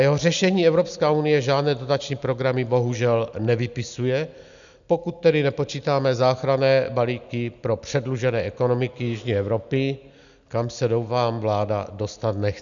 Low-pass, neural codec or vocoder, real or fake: 7.2 kHz; none; real